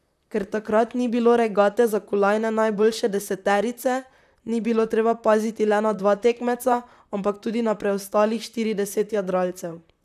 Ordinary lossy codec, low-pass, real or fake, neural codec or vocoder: none; 14.4 kHz; fake; vocoder, 44.1 kHz, 128 mel bands, Pupu-Vocoder